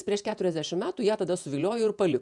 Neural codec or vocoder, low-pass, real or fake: vocoder, 48 kHz, 128 mel bands, Vocos; 10.8 kHz; fake